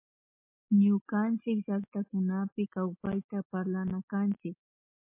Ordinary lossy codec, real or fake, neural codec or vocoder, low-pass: MP3, 24 kbps; real; none; 3.6 kHz